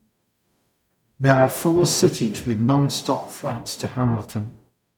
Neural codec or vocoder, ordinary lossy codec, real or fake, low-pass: codec, 44.1 kHz, 0.9 kbps, DAC; none; fake; 19.8 kHz